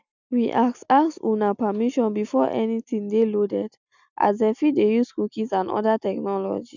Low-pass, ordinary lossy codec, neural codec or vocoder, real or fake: 7.2 kHz; none; none; real